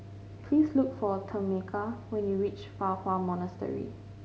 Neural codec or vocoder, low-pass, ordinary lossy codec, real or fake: none; none; none; real